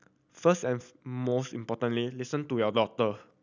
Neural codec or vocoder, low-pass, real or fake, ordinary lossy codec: none; 7.2 kHz; real; none